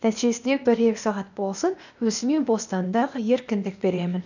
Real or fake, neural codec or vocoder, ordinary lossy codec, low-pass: fake; codec, 16 kHz, 0.8 kbps, ZipCodec; none; 7.2 kHz